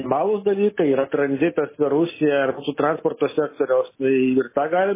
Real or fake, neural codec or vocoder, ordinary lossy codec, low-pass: real; none; MP3, 16 kbps; 3.6 kHz